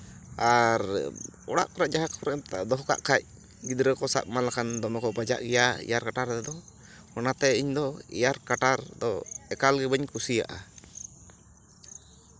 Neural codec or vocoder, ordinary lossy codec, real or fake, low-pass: none; none; real; none